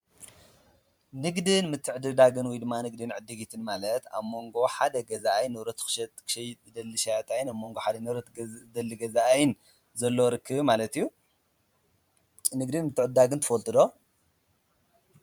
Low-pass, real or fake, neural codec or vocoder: 19.8 kHz; fake; vocoder, 44.1 kHz, 128 mel bands every 512 samples, BigVGAN v2